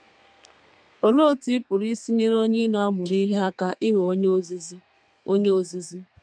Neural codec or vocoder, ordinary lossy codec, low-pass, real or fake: codec, 32 kHz, 1.9 kbps, SNAC; none; 9.9 kHz; fake